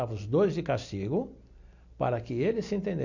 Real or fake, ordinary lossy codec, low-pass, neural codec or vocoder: real; none; 7.2 kHz; none